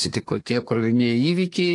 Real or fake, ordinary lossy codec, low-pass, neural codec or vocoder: fake; AAC, 48 kbps; 10.8 kHz; codec, 24 kHz, 1 kbps, SNAC